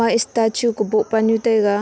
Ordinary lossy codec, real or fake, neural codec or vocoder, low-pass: none; real; none; none